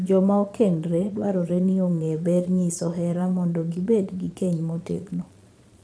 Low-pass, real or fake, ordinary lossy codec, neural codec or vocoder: none; fake; none; vocoder, 22.05 kHz, 80 mel bands, Vocos